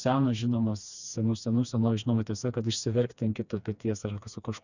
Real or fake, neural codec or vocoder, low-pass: fake; codec, 16 kHz, 2 kbps, FreqCodec, smaller model; 7.2 kHz